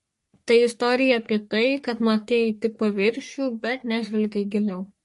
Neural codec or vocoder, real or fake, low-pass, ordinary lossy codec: codec, 44.1 kHz, 3.4 kbps, Pupu-Codec; fake; 14.4 kHz; MP3, 48 kbps